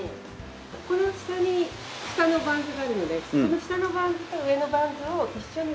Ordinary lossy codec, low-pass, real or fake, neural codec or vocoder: none; none; real; none